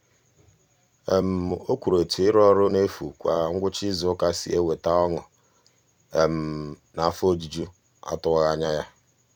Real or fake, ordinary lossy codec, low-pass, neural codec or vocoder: fake; none; 19.8 kHz; vocoder, 44.1 kHz, 128 mel bands every 256 samples, BigVGAN v2